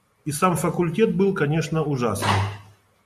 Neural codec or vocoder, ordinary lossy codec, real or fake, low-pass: none; MP3, 64 kbps; real; 14.4 kHz